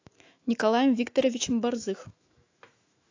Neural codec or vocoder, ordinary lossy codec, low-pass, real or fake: autoencoder, 48 kHz, 128 numbers a frame, DAC-VAE, trained on Japanese speech; AAC, 48 kbps; 7.2 kHz; fake